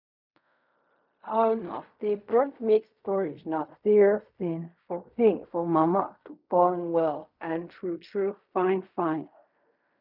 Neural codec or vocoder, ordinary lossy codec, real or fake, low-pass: codec, 16 kHz in and 24 kHz out, 0.4 kbps, LongCat-Audio-Codec, fine tuned four codebook decoder; none; fake; 5.4 kHz